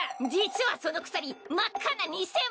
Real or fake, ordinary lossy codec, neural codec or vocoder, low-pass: real; none; none; none